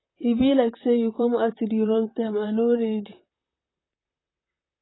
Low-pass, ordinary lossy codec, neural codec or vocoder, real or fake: 7.2 kHz; AAC, 16 kbps; vocoder, 44.1 kHz, 128 mel bands, Pupu-Vocoder; fake